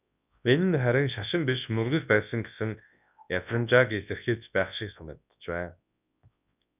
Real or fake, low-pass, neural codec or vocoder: fake; 3.6 kHz; codec, 24 kHz, 0.9 kbps, WavTokenizer, large speech release